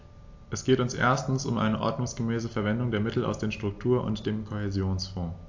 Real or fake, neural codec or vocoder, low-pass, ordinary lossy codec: real; none; 7.2 kHz; AAC, 48 kbps